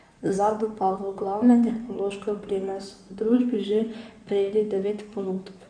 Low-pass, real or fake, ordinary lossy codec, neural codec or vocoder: 9.9 kHz; fake; none; codec, 16 kHz in and 24 kHz out, 2.2 kbps, FireRedTTS-2 codec